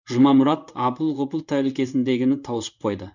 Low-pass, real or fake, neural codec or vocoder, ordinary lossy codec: 7.2 kHz; fake; codec, 16 kHz in and 24 kHz out, 1 kbps, XY-Tokenizer; none